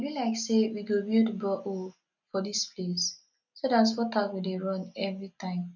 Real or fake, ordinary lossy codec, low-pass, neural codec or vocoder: real; none; 7.2 kHz; none